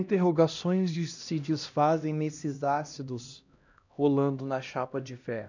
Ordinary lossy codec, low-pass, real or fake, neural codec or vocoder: none; 7.2 kHz; fake; codec, 16 kHz, 1 kbps, X-Codec, HuBERT features, trained on LibriSpeech